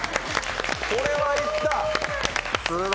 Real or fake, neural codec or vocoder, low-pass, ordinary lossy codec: real; none; none; none